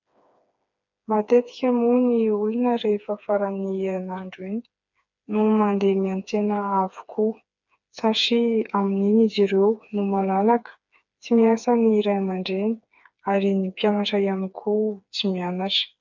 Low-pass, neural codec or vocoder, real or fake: 7.2 kHz; codec, 16 kHz, 4 kbps, FreqCodec, smaller model; fake